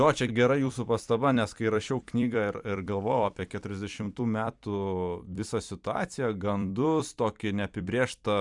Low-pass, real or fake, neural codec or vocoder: 10.8 kHz; fake; vocoder, 44.1 kHz, 128 mel bands every 256 samples, BigVGAN v2